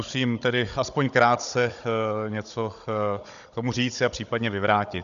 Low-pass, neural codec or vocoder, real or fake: 7.2 kHz; codec, 16 kHz, 16 kbps, FunCodec, trained on Chinese and English, 50 frames a second; fake